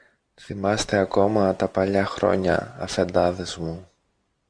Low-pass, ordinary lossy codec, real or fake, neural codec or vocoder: 9.9 kHz; Opus, 64 kbps; real; none